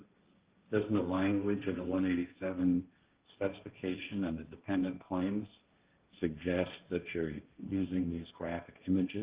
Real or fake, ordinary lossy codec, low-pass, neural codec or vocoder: fake; Opus, 32 kbps; 3.6 kHz; codec, 16 kHz, 1.1 kbps, Voila-Tokenizer